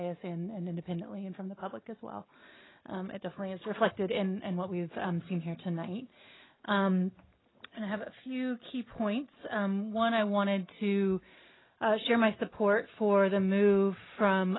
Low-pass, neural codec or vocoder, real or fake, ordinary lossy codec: 7.2 kHz; none; real; AAC, 16 kbps